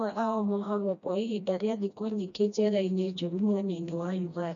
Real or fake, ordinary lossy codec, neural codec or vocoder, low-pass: fake; none; codec, 16 kHz, 1 kbps, FreqCodec, smaller model; 7.2 kHz